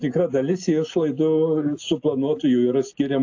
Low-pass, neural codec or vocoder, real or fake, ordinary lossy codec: 7.2 kHz; none; real; AAC, 48 kbps